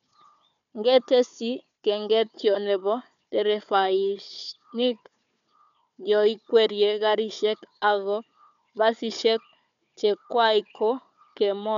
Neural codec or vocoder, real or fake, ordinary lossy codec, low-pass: codec, 16 kHz, 4 kbps, FunCodec, trained on Chinese and English, 50 frames a second; fake; MP3, 96 kbps; 7.2 kHz